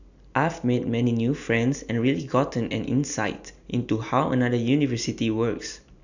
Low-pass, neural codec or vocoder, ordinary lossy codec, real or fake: 7.2 kHz; none; none; real